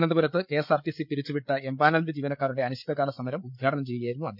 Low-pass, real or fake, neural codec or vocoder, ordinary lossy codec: 5.4 kHz; fake; codec, 16 kHz, 8 kbps, FreqCodec, larger model; none